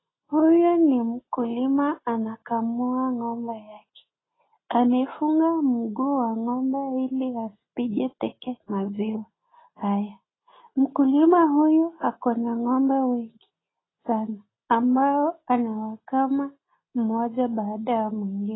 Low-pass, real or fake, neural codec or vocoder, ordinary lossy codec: 7.2 kHz; real; none; AAC, 16 kbps